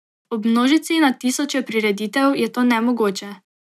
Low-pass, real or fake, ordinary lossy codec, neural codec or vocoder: 14.4 kHz; real; none; none